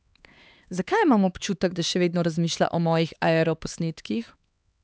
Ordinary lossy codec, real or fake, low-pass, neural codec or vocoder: none; fake; none; codec, 16 kHz, 4 kbps, X-Codec, HuBERT features, trained on LibriSpeech